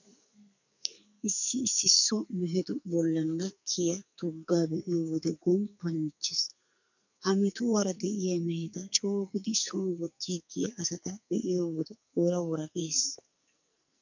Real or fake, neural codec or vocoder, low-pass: fake; codec, 44.1 kHz, 2.6 kbps, SNAC; 7.2 kHz